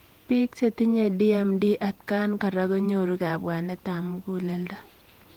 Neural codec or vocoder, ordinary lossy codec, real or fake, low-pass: vocoder, 48 kHz, 128 mel bands, Vocos; Opus, 24 kbps; fake; 19.8 kHz